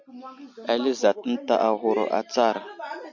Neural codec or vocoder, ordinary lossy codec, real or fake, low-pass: none; MP3, 64 kbps; real; 7.2 kHz